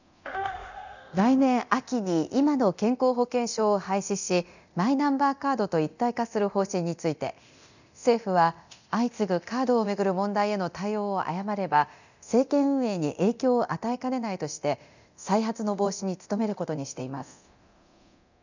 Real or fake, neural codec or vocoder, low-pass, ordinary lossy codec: fake; codec, 24 kHz, 0.9 kbps, DualCodec; 7.2 kHz; none